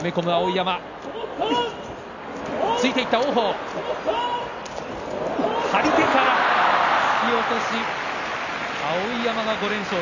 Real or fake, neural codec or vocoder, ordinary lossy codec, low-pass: real; none; none; 7.2 kHz